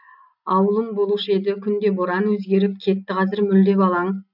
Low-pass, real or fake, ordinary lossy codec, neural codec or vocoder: 5.4 kHz; real; none; none